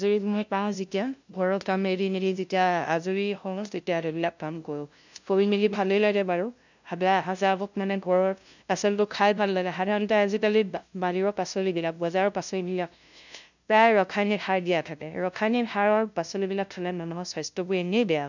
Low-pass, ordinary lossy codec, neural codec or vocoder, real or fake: 7.2 kHz; none; codec, 16 kHz, 0.5 kbps, FunCodec, trained on LibriTTS, 25 frames a second; fake